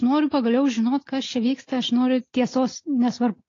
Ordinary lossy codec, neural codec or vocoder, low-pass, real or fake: AAC, 32 kbps; none; 7.2 kHz; real